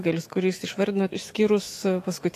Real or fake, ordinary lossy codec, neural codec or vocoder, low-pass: fake; AAC, 48 kbps; autoencoder, 48 kHz, 128 numbers a frame, DAC-VAE, trained on Japanese speech; 14.4 kHz